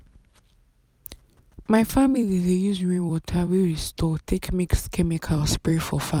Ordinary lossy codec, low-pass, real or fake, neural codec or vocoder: none; 19.8 kHz; fake; vocoder, 44.1 kHz, 128 mel bands every 256 samples, BigVGAN v2